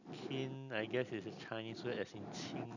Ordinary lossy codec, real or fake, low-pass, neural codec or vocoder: none; real; 7.2 kHz; none